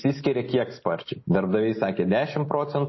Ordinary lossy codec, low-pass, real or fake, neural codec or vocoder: MP3, 24 kbps; 7.2 kHz; real; none